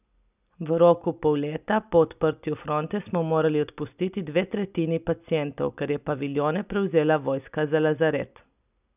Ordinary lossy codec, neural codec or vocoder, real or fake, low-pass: none; none; real; 3.6 kHz